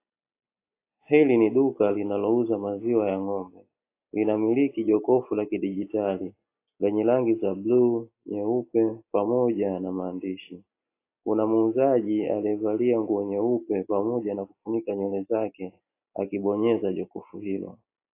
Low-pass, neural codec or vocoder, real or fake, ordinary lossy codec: 3.6 kHz; none; real; AAC, 24 kbps